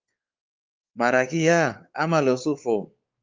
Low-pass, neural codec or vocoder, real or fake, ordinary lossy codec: 7.2 kHz; codec, 16 kHz, 4 kbps, X-Codec, WavLM features, trained on Multilingual LibriSpeech; fake; Opus, 32 kbps